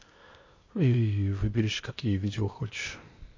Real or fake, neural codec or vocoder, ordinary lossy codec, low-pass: fake; codec, 16 kHz, 0.8 kbps, ZipCodec; MP3, 32 kbps; 7.2 kHz